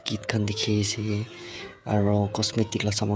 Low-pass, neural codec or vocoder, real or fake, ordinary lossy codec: none; codec, 16 kHz, 16 kbps, FreqCodec, smaller model; fake; none